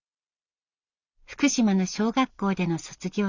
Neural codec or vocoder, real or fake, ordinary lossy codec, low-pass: none; real; Opus, 64 kbps; 7.2 kHz